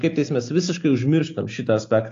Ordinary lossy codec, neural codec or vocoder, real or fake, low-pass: AAC, 48 kbps; none; real; 7.2 kHz